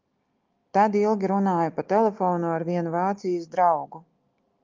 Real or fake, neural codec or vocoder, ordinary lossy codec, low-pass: real; none; Opus, 24 kbps; 7.2 kHz